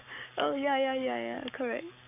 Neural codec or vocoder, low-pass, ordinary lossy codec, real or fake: codec, 44.1 kHz, 7.8 kbps, Pupu-Codec; 3.6 kHz; AAC, 32 kbps; fake